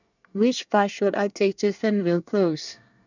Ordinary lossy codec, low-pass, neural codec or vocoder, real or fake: none; 7.2 kHz; codec, 24 kHz, 1 kbps, SNAC; fake